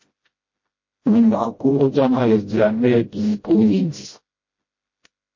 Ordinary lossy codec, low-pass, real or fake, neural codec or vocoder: MP3, 32 kbps; 7.2 kHz; fake; codec, 16 kHz, 0.5 kbps, FreqCodec, smaller model